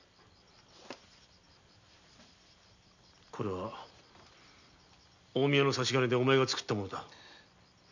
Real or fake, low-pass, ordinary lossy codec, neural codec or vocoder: real; 7.2 kHz; none; none